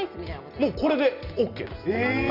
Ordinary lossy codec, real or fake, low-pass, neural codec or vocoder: none; real; 5.4 kHz; none